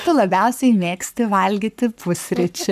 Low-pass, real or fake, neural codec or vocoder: 14.4 kHz; fake; codec, 44.1 kHz, 7.8 kbps, Pupu-Codec